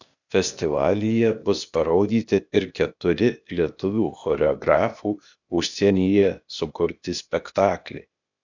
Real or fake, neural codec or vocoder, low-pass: fake; codec, 16 kHz, 0.8 kbps, ZipCodec; 7.2 kHz